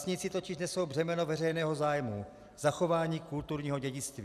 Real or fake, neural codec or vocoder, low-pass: real; none; 14.4 kHz